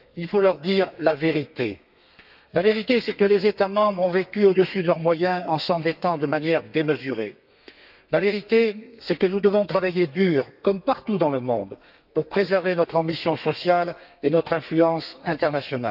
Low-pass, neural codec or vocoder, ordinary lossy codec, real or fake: 5.4 kHz; codec, 32 kHz, 1.9 kbps, SNAC; none; fake